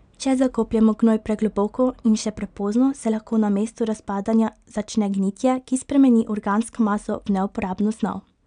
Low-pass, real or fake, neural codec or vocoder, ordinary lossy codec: 9.9 kHz; real; none; none